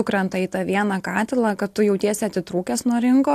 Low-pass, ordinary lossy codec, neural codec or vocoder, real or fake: 14.4 kHz; AAC, 64 kbps; none; real